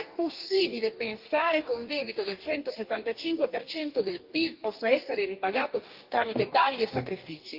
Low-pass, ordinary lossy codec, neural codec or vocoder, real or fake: 5.4 kHz; Opus, 32 kbps; codec, 44.1 kHz, 2.6 kbps, DAC; fake